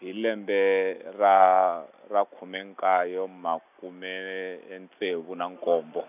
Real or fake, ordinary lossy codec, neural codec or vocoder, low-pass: real; none; none; 3.6 kHz